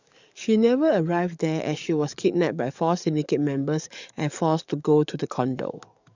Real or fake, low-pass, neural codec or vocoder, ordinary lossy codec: fake; 7.2 kHz; codec, 44.1 kHz, 7.8 kbps, DAC; none